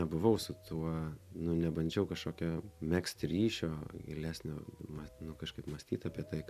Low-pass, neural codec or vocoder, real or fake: 14.4 kHz; none; real